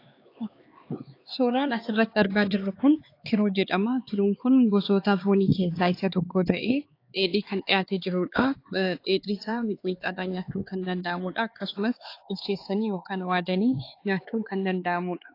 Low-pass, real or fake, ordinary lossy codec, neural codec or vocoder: 5.4 kHz; fake; AAC, 32 kbps; codec, 16 kHz, 4 kbps, X-Codec, HuBERT features, trained on LibriSpeech